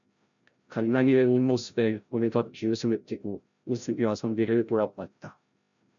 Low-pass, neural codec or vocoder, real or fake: 7.2 kHz; codec, 16 kHz, 0.5 kbps, FreqCodec, larger model; fake